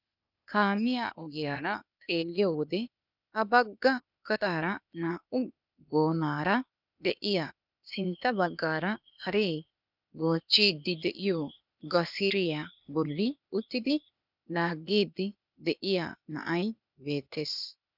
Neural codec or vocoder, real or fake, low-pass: codec, 16 kHz, 0.8 kbps, ZipCodec; fake; 5.4 kHz